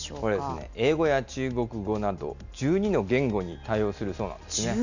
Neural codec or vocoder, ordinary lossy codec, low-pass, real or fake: none; none; 7.2 kHz; real